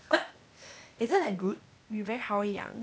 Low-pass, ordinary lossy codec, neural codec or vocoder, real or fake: none; none; codec, 16 kHz, 0.8 kbps, ZipCodec; fake